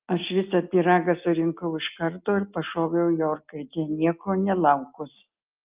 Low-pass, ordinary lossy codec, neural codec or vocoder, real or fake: 3.6 kHz; Opus, 24 kbps; none; real